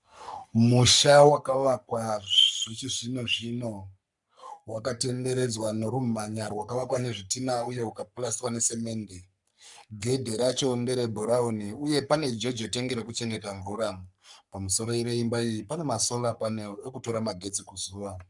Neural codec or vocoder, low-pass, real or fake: codec, 44.1 kHz, 3.4 kbps, Pupu-Codec; 10.8 kHz; fake